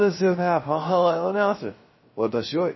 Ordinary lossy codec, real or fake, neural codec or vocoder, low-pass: MP3, 24 kbps; fake; codec, 16 kHz, 0.3 kbps, FocalCodec; 7.2 kHz